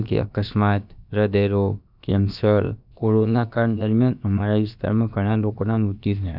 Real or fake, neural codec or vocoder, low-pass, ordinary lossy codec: fake; codec, 16 kHz, about 1 kbps, DyCAST, with the encoder's durations; 5.4 kHz; none